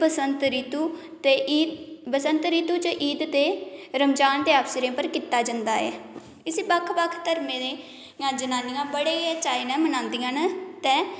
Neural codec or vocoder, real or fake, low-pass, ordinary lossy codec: none; real; none; none